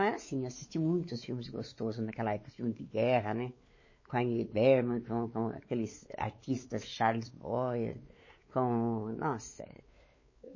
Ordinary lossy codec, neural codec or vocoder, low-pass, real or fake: MP3, 32 kbps; codec, 16 kHz, 4 kbps, X-Codec, WavLM features, trained on Multilingual LibriSpeech; 7.2 kHz; fake